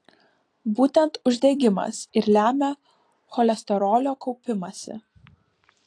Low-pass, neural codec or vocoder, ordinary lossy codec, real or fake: 9.9 kHz; vocoder, 44.1 kHz, 128 mel bands every 256 samples, BigVGAN v2; AAC, 48 kbps; fake